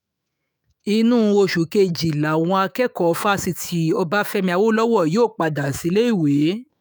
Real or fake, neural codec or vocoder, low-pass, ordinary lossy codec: fake; autoencoder, 48 kHz, 128 numbers a frame, DAC-VAE, trained on Japanese speech; none; none